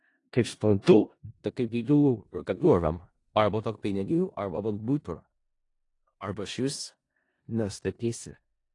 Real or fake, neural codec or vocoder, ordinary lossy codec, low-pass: fake; codec, 16 kHz in and 24 kHz out, 0.4 kbps, LongCat-Audio-Codec, four codebook decoder; AAC, 48 kbps; 10.8 kHz